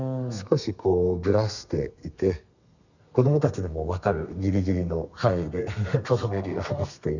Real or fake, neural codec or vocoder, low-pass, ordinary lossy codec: fake; codec, 32 kHz, 1.9 kbps, SNAC; 7.2 kHz; none